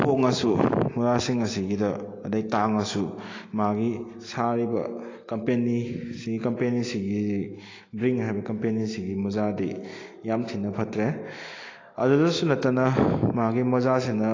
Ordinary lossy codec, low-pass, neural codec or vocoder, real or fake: AAC, 32 kbps; 7.2 kHz; none; real